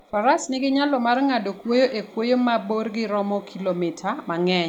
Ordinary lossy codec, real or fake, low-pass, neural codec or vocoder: none; real; 19.8 kHz; none